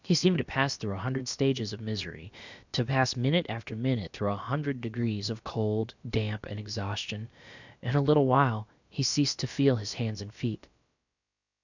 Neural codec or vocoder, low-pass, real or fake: codec, 16 kHz, about 1 kbps, DyCAST, with the encoder's durations; 7.2 kHz; fake